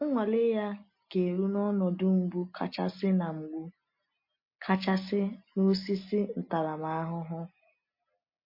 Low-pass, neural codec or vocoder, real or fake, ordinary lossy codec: 5.4 kHz; none; real; MP3, 32 kbps